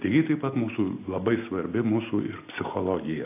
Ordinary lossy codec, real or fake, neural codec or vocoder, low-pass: AAC, 24 kbps; real; none; 3.6 kHz